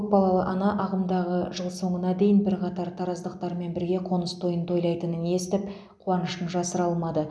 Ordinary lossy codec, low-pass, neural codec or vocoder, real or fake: none; none; none; real